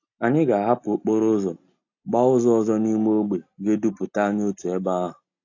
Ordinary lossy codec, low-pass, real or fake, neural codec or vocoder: AAC, 48 kbps; 7.2 kHz; real; none